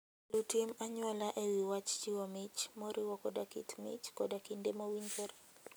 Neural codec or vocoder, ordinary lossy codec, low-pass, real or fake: none; none; none; real